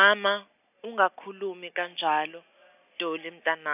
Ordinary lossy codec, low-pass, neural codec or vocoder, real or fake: none; 3.6 kHz; none; real